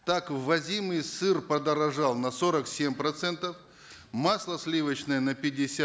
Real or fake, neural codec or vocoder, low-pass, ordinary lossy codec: real; none; none; none